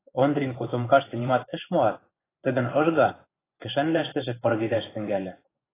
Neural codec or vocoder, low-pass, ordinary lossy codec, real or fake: vocoder, 24 kHz, 100 mel bands, Vocos; 3.6 kHz; AAC, 16 kbps; fake